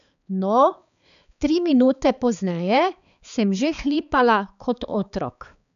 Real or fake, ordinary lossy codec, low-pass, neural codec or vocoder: fake; none; 7.2 kHz; codec, 16 kHz, 4 kbps, X-Codec, HuBERT features, trained on balanced general audio